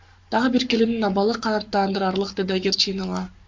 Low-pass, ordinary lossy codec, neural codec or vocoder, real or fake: 7.2 kHz; MP3, 64 kbps; codec, 44.1 kHz, 7.8 kbps, Pupu-Codec; fake